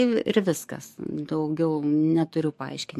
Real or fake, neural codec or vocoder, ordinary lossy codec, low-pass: fake; codec, 44.1 kHz, 7.8 kbps, DAC; MP3, 64 kbps; 14.4 kHz